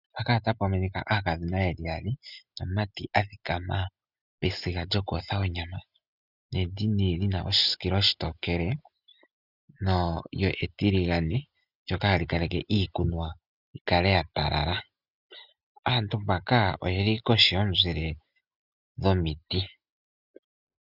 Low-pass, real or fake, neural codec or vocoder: 5.4 kHz; real; none